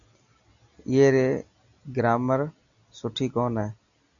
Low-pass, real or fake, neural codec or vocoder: 7.2 kHz; real; none